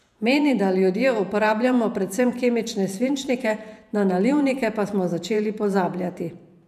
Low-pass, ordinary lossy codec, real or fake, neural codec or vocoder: 14.4 kHz; none; fake; vocoder, 48 kHz, 128 mel bands, Vocos